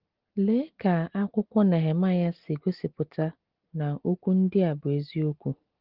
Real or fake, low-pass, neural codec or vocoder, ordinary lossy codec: real; 5.4 kHz; none; Opus, 16 kbps